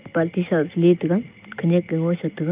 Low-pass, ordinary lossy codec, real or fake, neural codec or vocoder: 3.6 kHz; Opus, 24 kbps; real; none